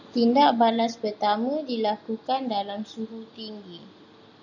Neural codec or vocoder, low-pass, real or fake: none; 7.2 kHz; real